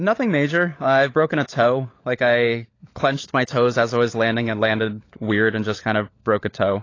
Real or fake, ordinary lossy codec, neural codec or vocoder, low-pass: fake; AAC, 32 kbps; codec, 16 kHz, 16 kbps, FunCodec, trained on LibriTTS, 50 frames a second; 7.2 kHz